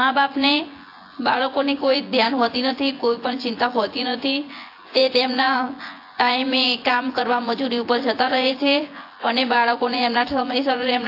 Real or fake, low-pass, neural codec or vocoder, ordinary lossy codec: fake; 5.4 kHz; vocoder, 24 kHz, 100 mel bands, Vocos; AAC, 32 kbps